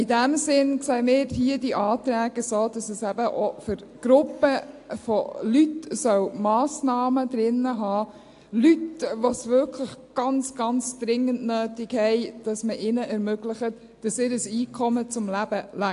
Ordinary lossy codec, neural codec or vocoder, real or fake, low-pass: AAC, 64 kbps; none; real; 10.8 kHz